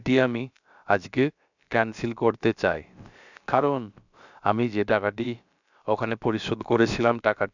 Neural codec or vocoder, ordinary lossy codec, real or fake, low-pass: codec, 16 kHz, about 1 kbps, DyCAST, with the encoder's durations; none; fake; 7.2 kHz